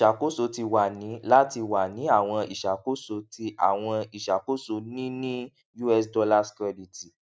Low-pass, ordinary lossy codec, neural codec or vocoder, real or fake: none; none; none; real